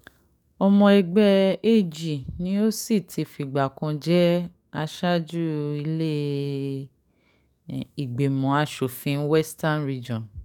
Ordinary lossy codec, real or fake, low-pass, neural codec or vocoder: none; fake; 19.8 kHz; codec, 44.1 kHz, 7.8 kbps, DAC